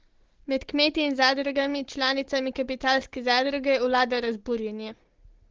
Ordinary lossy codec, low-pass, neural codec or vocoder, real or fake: Opus, 16 kbps; 7.2 kHz; none; real